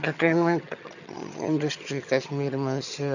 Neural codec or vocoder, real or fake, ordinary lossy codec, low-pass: vocoder, 22.05 kHz, 80 mel bands, HiFi-GAN; fake; none; 7.2 kHz